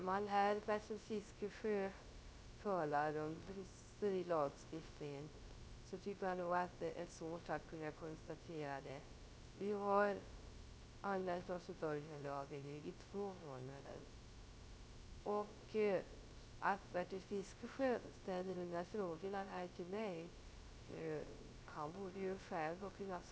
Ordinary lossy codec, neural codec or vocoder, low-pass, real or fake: none; codec, 16 kHz, 0.3 kbps, FocalCodec; none; fake